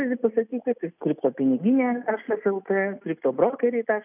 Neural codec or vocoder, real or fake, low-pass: none; real; 3.6 kHz